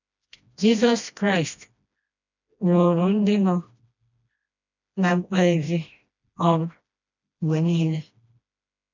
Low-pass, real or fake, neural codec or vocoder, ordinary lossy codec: 7.2 kHz; fake; codec, 16 kHz, 1 kbps, FreqCodec, smaller model; none